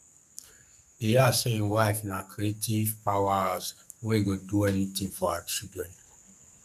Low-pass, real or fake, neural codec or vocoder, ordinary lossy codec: 14.4 kHz; fake; codec, 44.1 kHz, 2.6 kbps, SNAC; none